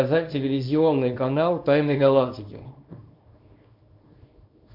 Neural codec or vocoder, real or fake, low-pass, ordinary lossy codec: codec, 24 kHz, 0.9 kbps, WavTokenizer, small release; fake; 5.4 kHz; MP3, 48 kbps